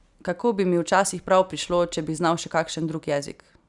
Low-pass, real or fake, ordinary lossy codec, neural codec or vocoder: 10.8 kHz; real; none; none